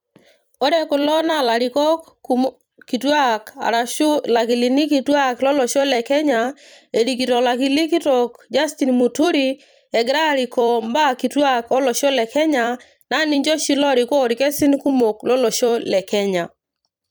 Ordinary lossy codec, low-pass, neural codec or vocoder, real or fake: none; none; vocoder, 44.1 kHz, 128 mel bands every 256 samples, BigVGAN v2; fake